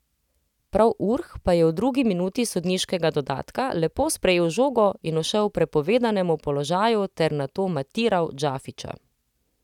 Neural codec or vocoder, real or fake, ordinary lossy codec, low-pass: none; real; none; 19.8 kHz